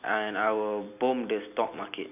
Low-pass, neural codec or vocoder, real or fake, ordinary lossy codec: 3.6 kHz; none; real; none